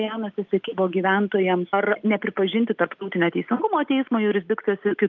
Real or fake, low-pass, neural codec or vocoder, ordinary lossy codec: real; 7.2 kHz; none; Opus, 24 kbps